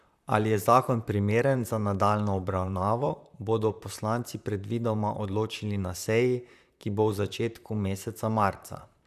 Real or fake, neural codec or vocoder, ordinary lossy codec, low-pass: fake; vocoder, 44.1 kHz, 128 mel bands, Pupu-Vocoder; none; 14.4 kHz